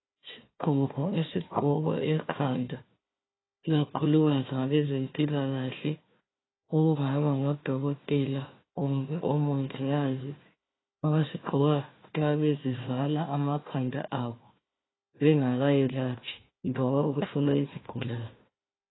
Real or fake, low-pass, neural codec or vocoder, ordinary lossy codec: fake; 7.2 kHz; codec, 16 kHz, 1 kbps, FunCodec, trained on Chinese and English, 50 frames a second; AAC, 16 kbps